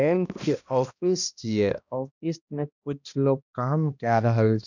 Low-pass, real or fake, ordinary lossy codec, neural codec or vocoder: 7.2 kHz; fake; none; codec, 16 kHz, 1 kbps, X-Codec, HuBERT features, trained on balanced general audio